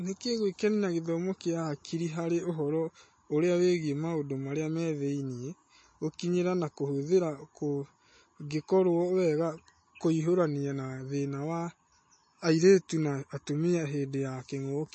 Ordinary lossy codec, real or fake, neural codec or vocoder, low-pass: MP3, 32 kbps; real; none; 10.8 kHz